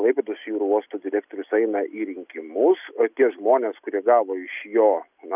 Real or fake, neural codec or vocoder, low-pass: real; none; 3.6 kHz